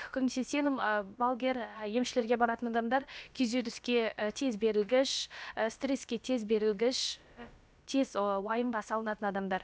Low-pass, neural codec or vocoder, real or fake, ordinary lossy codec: none; codec, 16 kHz, about 1 kbps, DyCAST, with the encoder's durations; fake; none